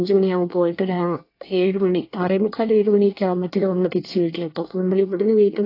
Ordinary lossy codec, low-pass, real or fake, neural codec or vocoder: AAC, 32 kbps; 5.4 kHz; fake; codec, 24 kHz, 1 kbps, SNAC